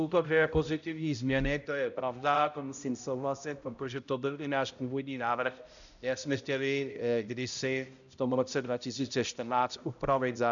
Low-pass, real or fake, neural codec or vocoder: 7.2 kHz; fake; codec, 16 kHz, 0.5 kbps, X-Codec, HuBERT features, trained on balanced general audio